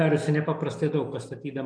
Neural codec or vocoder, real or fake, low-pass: none; real; 9.9 kHz